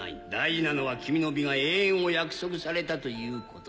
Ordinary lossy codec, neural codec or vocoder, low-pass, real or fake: none; none; none; real